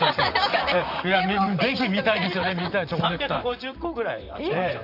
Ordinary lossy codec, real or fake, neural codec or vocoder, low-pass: none; fake; vocoder, 44.1 kHz, 128 mel bands, Pupu-Vocoder; 5.4 kHz